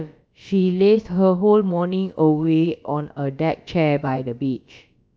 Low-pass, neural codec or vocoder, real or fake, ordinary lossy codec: none; codec, 16 kHz, about 1 kbps, DyCAST, with the encoder's durations; fake; none